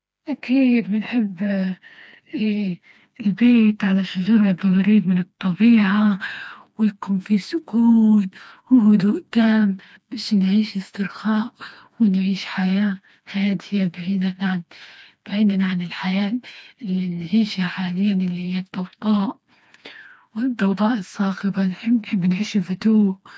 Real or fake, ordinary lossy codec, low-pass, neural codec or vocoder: fake; none; none; codec, 16 kHz, 2 kbps, FreqCodec, smaller model